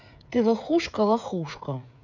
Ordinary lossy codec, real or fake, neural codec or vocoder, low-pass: none; fake; codec, 16 kHz, 16 kbps, FreqCodec, smaller model; 7.2 kHz